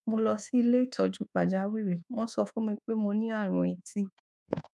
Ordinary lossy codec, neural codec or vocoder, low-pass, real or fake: none; codec, 24 kHz, 1.2 kbps, DualCodec; none; fake